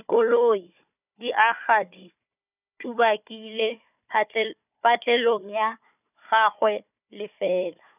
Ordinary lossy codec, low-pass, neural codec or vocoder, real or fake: none; 3.6 kHz; codec, 16 kHz, 4 kbps, FunCodec, trained on Chinese and English, 50 frames a second; fake